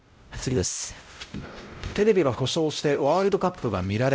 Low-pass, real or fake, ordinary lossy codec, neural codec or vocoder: none; fake; none; codec, 16 kHz, 0.5 kbps, X-Codec, WavLM features, trained on Multilingual LibriSpeech